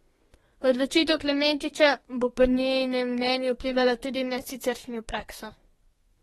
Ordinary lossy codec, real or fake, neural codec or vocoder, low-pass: AAC, 32 kbps; fake; codec, 32 kHz, 1.9 kbps, SNAC; 14.4 kHz